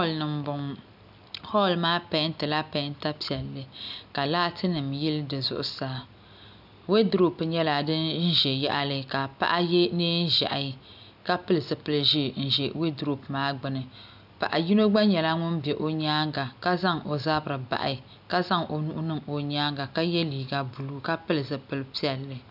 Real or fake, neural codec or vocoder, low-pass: real; none; 5.4 kHz